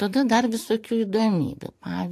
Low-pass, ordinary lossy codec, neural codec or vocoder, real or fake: 14.4 kHz; AAC, 64 kbps; vocoder, 44.1 kHz, 128 mel bands every 512 samples, BigVGAN v2; fake